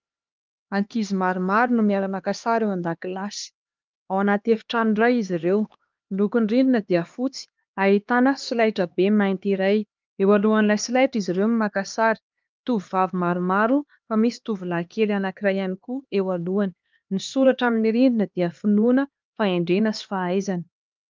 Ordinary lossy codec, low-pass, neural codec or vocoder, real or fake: Opus, 24 kbps; 7.2 kHz; codec, 16 kHz, 2 kbps, X-Codec, HuBERT features, trained on LibriSpeech; fake